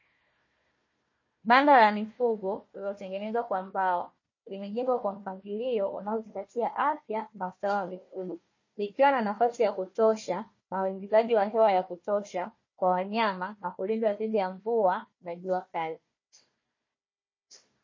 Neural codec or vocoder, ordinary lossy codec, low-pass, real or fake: codec, 16 kHz, 1 kbps, FunCodec, trained on Chinese and English, 50 frames a second; MP3, 32 kbps; 7.2 kHz; fake